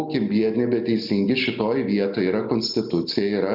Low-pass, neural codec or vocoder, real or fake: 5.4 kHz; none; real